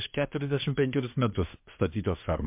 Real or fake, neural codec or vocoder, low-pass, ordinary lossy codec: fake; codec, 24 kHz, 1 kbps, SNAC; 3.6 kHz; MP3, 32 kbps